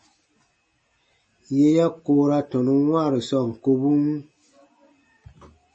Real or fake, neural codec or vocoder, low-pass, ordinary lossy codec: real; none; 9.9 kHz; MP3, 32 kbps